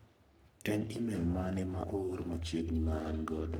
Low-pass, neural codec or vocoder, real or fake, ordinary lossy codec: none; codec, 44.1 kHz, 3.4 kbps, Pupu-Codec; fake; none